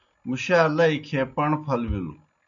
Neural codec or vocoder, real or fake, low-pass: none; real; 7.2 kHz